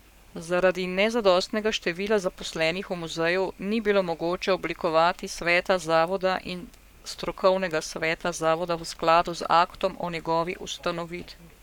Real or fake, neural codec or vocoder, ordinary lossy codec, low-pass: fake; codec, 44.1 kHz, 7.8 kbps, Pupu-Codec; none; 19.8 kHz